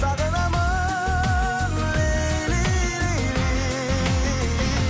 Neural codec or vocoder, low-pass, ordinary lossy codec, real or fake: none; none; none; real